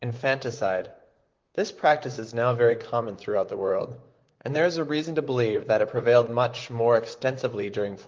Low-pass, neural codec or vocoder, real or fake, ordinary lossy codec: 7.2 kHz; vocoder, 44.1 kHz, 128 mel bands, Pupu-Vocoder; fake; Opus, 32 kbps